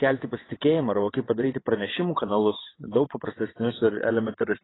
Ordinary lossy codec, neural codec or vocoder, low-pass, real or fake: AAC, 16 kbps; vocoder, 22.05 kHz, 80 mel bands, WaveNeXt; 7.2 kHz; fake